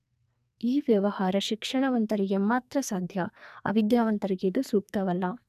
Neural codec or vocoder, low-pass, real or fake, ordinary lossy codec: codec, 44.1 kHz, 2.6 kbps, SNAC; 14.4 kHz; fake; none